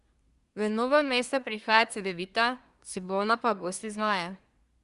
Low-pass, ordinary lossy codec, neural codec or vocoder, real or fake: 10.8 kHz; Opus, 64 kbps; codec, 24 kHz, 1 kbps, SNAC; fake